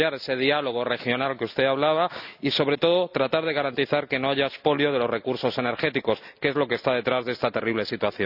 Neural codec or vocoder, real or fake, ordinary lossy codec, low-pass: none; real; none; 5.4 kHz